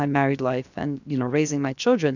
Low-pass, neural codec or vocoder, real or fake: 7.2 kHz; codec, 16 kHz, 0.7 kbps, FocalCodec; fake